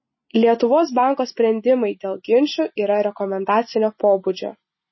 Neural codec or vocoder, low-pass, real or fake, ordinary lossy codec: none; 7.2 kHz; real; MP3, 24 kbps